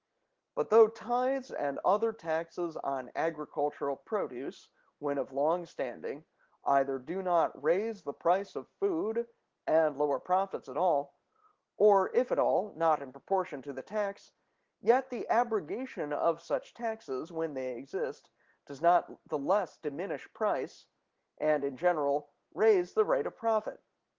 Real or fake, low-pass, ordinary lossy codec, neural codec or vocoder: real; 7.2 kHz; Opus, 16 kbps; none